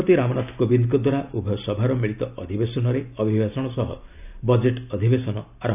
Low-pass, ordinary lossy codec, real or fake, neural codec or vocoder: 3.6 kHz; none; real; none